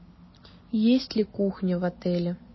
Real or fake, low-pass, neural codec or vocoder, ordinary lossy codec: real; 7.2 kHz; none; MP3, 24 kbps